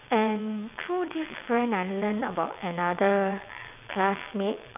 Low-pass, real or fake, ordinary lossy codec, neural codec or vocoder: 3.6 kHz; fake; none; vocoder, 22.05 kHz, 80 mel bands, WaveNeXt